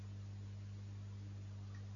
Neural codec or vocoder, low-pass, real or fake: none; 7.2 kHz; real